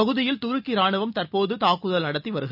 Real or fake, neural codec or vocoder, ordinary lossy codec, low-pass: real; none; none; 5.4 kHz